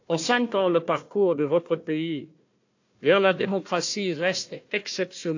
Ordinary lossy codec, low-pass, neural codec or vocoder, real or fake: AAC, 48 kbps; 7.2 kHz; codec, 16 kHz, 1 kbps, FunCodec, trained on Chinese and English, 50 frames a second; fake